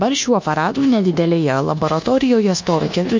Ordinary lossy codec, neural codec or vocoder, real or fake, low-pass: MP3, 48 kbps; codec, 24 kHz, 1.2 kbps, DualCodec; fake; 7.2 kHz